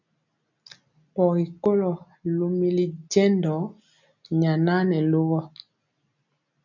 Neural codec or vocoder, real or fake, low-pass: none; real; 7.2 kHz